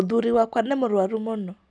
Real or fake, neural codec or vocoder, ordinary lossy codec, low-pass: real; none; none; none